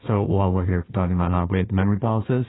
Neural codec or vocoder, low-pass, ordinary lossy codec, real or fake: codec, 16 kHz, 1 kbps, FunCodec, trained on Chinese and English, 50 frames a second; 7.2 kHz; AAC, 16 kbps; fake